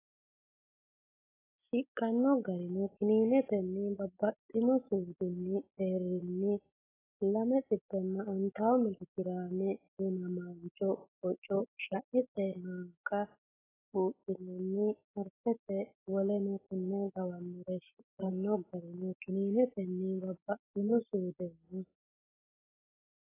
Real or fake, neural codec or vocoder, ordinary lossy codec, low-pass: real; none; AAC, 16 kbps; 3.6 kHz